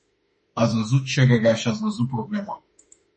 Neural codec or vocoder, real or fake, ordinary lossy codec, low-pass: autoencoder, 48 kHz, 32 numbers a frame, DAC-VAE, trained on Japanese speech; fake; MP3, 32 kbps; 10.8 kHz